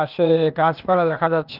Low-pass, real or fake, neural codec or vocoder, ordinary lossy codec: 5.4 kHz; fake; codec, 16 kHz, 0.8 kbps, ZipCodec; Opus, 24 kbps